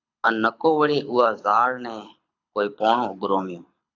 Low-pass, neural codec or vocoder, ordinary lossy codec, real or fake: 7.2 kHz; codec, 24 kHz, 6 kbps, HILCodec; AAC, 48 kbps; fake